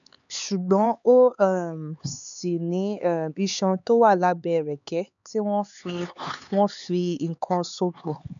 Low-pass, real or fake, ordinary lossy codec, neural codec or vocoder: 7.2 kHz; fake; none; codec, 16 kHz, 4 kbps, X-Codec, HuBERT features, trained on LibriSpeech